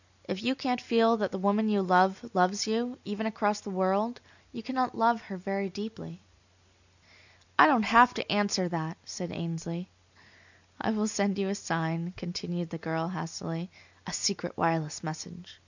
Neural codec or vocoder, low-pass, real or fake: none; 7.2 kHz; real